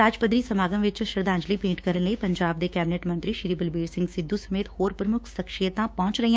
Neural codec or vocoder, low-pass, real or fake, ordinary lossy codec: codec, 16 kHz, 6 kbps, DAC; none; fake; none